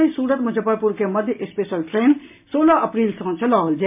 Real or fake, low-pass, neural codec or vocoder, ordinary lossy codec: real; 3.6 kHz; none; MP3, 32 kbps